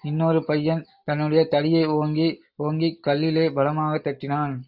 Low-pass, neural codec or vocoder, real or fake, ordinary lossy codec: 5.4 kHz; none; real; AAC, 48 kbps